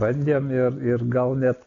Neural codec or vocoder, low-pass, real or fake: none; 7.2 kHz; real